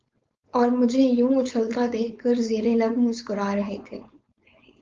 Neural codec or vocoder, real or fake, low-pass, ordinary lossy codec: codec, 16 kHz, 4.8 kbps, FACodec; fake; 7.2 kHz; Opus, 32 kbps